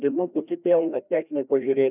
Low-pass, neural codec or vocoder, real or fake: 3.6 kHz; codec, 16 kHz, 1 kbps, FreqCodec, larger model; fake